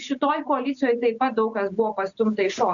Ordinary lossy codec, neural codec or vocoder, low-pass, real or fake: MP3, 96 kbps; none; 7.2 kHz; real